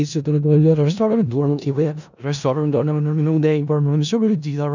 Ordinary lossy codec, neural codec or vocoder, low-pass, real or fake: none; codec, 16 kHz in and 24 kHz out, 0.4 kbps, LongCat-Audio-Codec, four codebook decoder; 7.2 kHz; fake